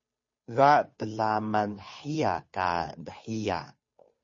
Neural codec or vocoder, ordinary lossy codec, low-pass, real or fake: codec, 16 kHz, 2 kbps, FunCodec, trained on Chinese and English, 25 frames a second; MP3, 32 kbps; 7.2 kHz; fake